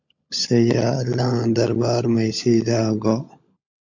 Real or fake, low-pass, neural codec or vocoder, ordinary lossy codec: fake; 7.2 kHz; codec, 16 kHz, 16 kbps, FunCodec, trained on LibriTTS, 50 frames a second; MP3, 48 kbps